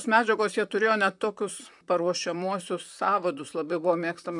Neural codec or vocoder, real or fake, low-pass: vocoder, 44.1 kHz, 128 mel bands, Pupu-Vocoder; fake; 10.8 kHz